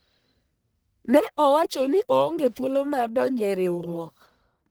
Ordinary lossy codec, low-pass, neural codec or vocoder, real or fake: none; none; codec, 44.1 kHz, 1.7 kbps, Pupu-Codec; fake